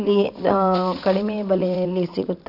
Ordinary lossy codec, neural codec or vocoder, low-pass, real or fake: none; vocoder, 44.1 kHz, 80 mel bands, Vocos; 5.4 kHz; fake